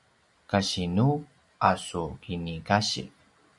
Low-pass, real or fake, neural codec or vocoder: 10.8 kHz; real; none